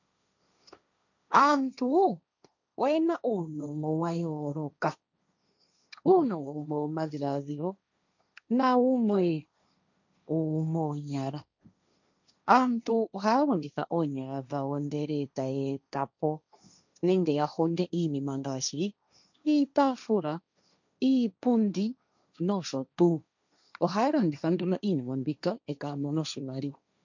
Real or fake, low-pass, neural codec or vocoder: fake; 7.2 kHz; codec, 16 kHz, 1.1 kbps, Voila-Tokenizer